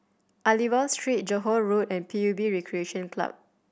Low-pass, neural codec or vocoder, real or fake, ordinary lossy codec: none; none; real; none